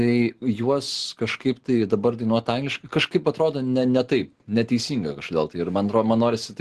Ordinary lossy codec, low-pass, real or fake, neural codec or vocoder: Opus, 16 kbps; 10.8 kHz; real; none